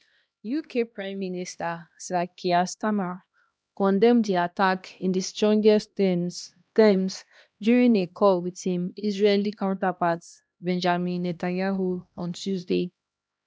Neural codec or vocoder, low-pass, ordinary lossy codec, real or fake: codec, 16 kHz, 1 kbps, X-Codec, HuBERT features, trained on LibriSpeech; none; none; fake